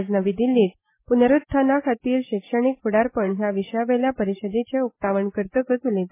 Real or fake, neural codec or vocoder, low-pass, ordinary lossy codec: real; none; 3.6 kHz; MP3, 16 kbps